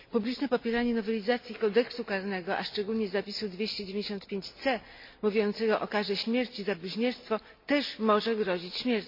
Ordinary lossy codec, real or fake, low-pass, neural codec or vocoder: MP3, 32 kbps; real; 5.4 kHz; none